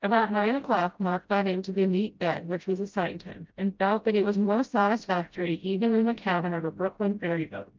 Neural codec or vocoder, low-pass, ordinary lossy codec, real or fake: codec, 16 kHz, 0.5 kbps, FreqCodec, smaller model; 7.2 kHz; Opus, 24 kbps; fake